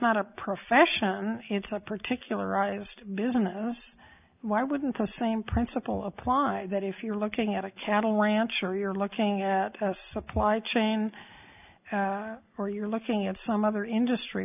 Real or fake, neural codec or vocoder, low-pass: real; none; 3.6 kHz